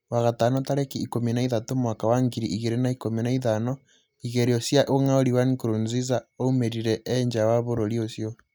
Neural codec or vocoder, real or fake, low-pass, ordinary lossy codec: none; real; none; none